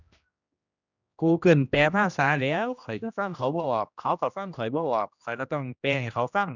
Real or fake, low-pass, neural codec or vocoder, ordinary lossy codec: fake; 7.2 kHz; codec, 16 kHz, 1 kbps, X-Codec, HuBERT features, trained on general audio; none